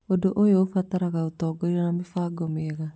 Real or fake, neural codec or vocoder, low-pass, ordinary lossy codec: real; none; none; none